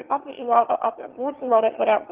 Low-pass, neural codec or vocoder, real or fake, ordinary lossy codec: 3.6 kHz; autoencoder, 22.05 kHz, a latent of 192 numbers a frame, VITS, trained on one speaker; fake; Opus, 16 kbps